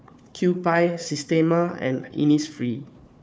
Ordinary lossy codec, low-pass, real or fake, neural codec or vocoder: none; none; fake; codec, 16 kHz, 4 kbps, FunCodec, trained on Chinese and English, 50 frames a second